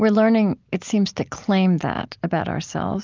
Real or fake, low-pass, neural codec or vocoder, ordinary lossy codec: real; 7.2 kHz; none; Opus, 32 kbps